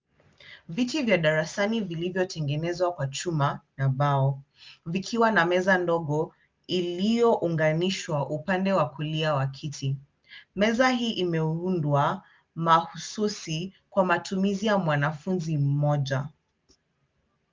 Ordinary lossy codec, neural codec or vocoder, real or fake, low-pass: Opus, 24 kbps; none; real; 7.2 kHz